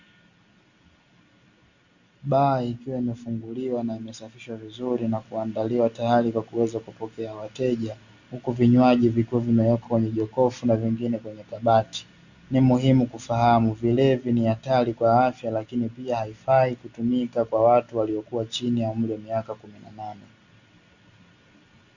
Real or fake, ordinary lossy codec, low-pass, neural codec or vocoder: real; Opus, 64 kbps; 7.2 kHz; none